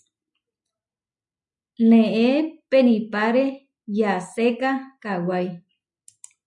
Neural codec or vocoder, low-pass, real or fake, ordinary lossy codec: none; 10.8 kHz; real; MP3, 96 kbps